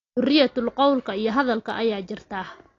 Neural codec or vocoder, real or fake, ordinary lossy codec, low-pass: none; real; AAC, 32 kbps; 7.2 kHz